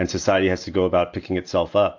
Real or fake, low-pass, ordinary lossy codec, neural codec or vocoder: real; 7.2 kHz; AAC, 48 kbps; none